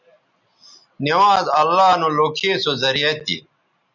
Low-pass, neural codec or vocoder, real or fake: 7.2 kHz; none; real